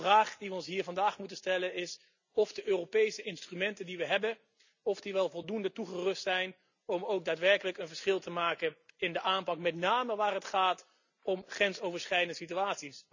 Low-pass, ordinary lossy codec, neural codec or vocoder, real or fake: 7.2 kHz; none; none; real